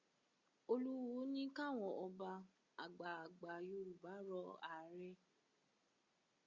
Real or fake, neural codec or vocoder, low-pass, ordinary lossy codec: real; none; 7.2 kHz; Opus, 64 kbps